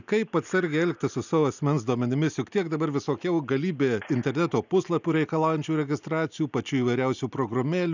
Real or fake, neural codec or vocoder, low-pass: real; none; 7.2 kHz